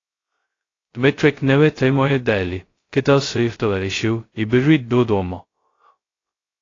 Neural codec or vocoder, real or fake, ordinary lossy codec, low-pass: codec, 16 kHz, 0.2 kbps, FocalCodec; fake; AAC, 32 kbps; 7.2 kHz